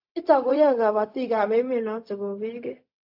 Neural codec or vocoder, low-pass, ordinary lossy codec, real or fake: codec, 16 kHz, 0.4 kbps, LongCat-Audio-Codec; 5.4 kHz; AAC, 48 kbps; fake